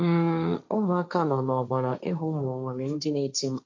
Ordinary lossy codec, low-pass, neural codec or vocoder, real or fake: MP3, 48 kbps; 7.2 kHz; codec, 16 kHz, 1.1 kbps, Voila-Tokenizer; fake